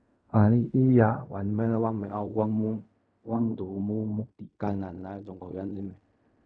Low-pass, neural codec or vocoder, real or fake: 9.9 kHz; codec, 16 kHz in and 24 kHz out, 0.4 kbps, LongCat-Audio-Codec, fine tuned four codebook decoder; fake